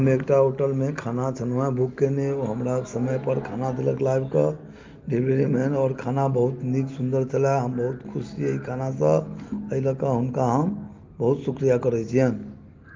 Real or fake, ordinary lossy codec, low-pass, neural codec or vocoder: real; Opus, 24 kbps; 7.2 kHz; none